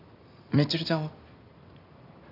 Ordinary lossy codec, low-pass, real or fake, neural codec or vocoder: none; 5.4 kHz; fake; vocoder, 44.1 kHz, 128 mel bands every 256 samples, BigVGAN v2